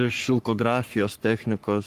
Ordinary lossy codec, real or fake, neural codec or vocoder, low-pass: Opus, 24 kbps; fake; codec, 44.1 kHz, 3.4 kbps, Pupu-Codec; 14.4 kHz